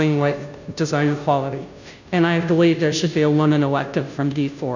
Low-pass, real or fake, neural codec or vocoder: 7.2 kHz; fake; codec, 16 kHz, 0.5 kbps, FunCodec, trained on Chinese and English, 25 frames a second